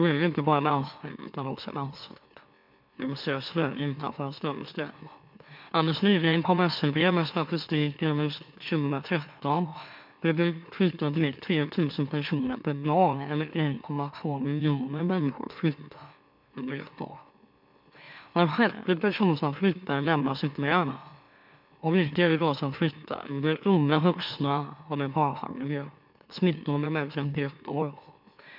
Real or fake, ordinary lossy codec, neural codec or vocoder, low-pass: fake; MP3, 48 kbps; autoencoder, 44.1 kHz, a latent of 192 numbers a frame, MeloTTS; 5.4 kHz